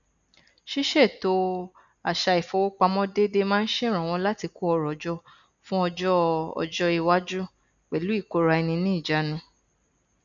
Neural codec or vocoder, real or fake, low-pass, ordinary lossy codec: none; real; 7.2 kHz; none